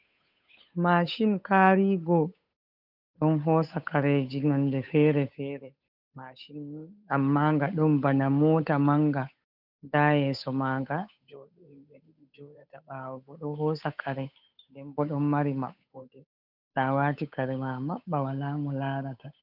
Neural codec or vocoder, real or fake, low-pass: codec, 16 kHz, 8 kbps, FunCodec, trained on Chinese and English, 25 frames a second; fake; 5.4 kHz